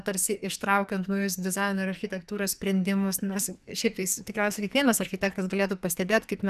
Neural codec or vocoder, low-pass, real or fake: codec, 44.1 kHz, 2.6 kbps, SNAC; 14.4 kHz; fake